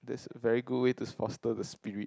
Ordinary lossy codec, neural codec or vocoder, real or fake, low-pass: none; none; real; none